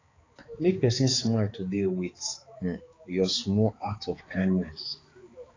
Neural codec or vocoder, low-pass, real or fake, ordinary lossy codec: codec, 16 kHz, 4 kbps, X-Codec, HuBERT features, trained on balanced general audio; 7.2 kHz; fake; AAC, 32 kbps